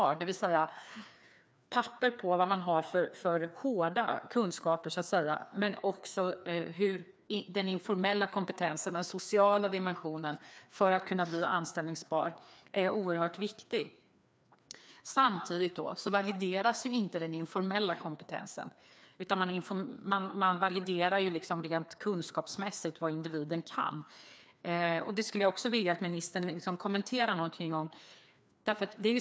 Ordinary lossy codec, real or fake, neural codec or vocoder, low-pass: none; fake; codec, 16 kHz, 2 kbps, FreqCodec, larger model; none